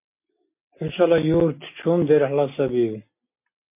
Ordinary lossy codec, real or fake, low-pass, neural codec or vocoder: MP3, 24 kbps; real; 3.6 kHz; none